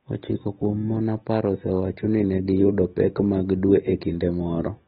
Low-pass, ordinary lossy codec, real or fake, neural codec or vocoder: 7.2 kHz; AAC, 16 kbps; real; none